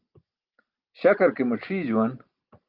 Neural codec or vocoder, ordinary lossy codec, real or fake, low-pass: none; Opus, 32 kbps; real; 5.4 kHz